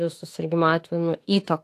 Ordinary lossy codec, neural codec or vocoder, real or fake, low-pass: AAC, 64 kbps; autoencoder, 48 kHz, 32 numbers a frame, DAC-VAE, trained on Japanese speech; fake; 14.4 kHz